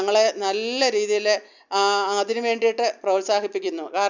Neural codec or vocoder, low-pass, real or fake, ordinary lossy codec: none; 7.2 kHz; real; none